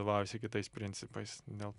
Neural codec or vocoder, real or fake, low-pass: none; real; 10.8 kHz